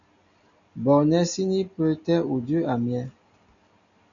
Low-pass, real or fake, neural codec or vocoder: 7.2 kHz; real; none